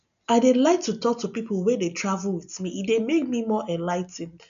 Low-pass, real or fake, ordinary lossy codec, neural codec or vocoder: 7.2 kHz; real; none; none